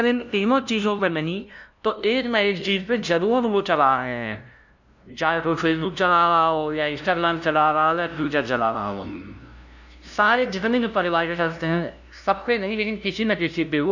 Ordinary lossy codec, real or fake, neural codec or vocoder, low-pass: none; fake; codec, 16 kHz, 0.5 kbps, FunCodec, trained on LibriTTS, 25 frames a second; 7.2 kHz